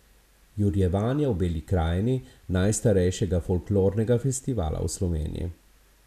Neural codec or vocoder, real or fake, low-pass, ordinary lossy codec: none; real; 14.4 kHz; none